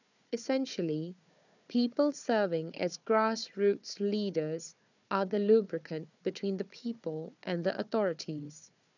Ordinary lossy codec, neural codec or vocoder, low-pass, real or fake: none; codec, 16 kHz, 4 kbps, FunCodec, trained on Chinese and English, 50 frames a second; 7.2 kHz; fake